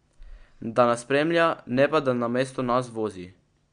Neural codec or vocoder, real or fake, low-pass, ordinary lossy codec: none; real; 9.9 kHz; MP3, 64 kbps